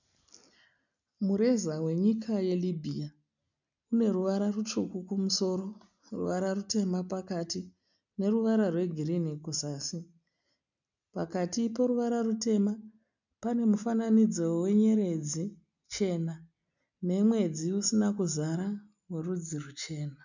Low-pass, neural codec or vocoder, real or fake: 7.2 kHz; none; real